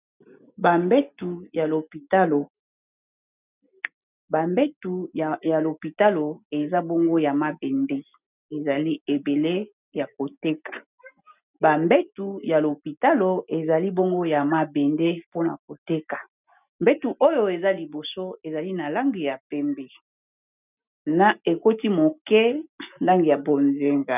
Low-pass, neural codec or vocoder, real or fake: 3.6 kHz; none; real